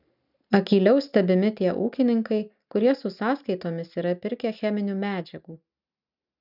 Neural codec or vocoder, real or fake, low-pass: none; real; 5.4 kHz